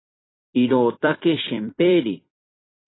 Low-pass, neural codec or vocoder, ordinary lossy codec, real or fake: 7.2 kHz; vocoder, 44.1 kHz, 128 mel bands every 256 samples, BigVGAN v2; AAC, 16 kbps; fake